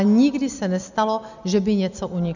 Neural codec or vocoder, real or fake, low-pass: none; real; 7.2 kHz